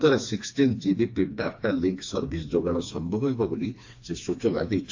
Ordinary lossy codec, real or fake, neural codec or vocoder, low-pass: AAC, 48 kbps; fake; codec, 16 kHz, 2 kbps, FreqCodec, smaller model; 7.2 kHz